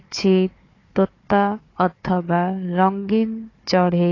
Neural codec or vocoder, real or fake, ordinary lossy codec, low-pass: codec, 16 kHz, 4 kbps, FunCodec, trained on Chinese and English, 50 frames a second; fake; AAC, 32 kbps; 7.2 kHz